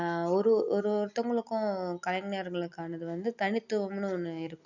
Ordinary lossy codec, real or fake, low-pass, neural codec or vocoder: none; real; 7.2 kHz; none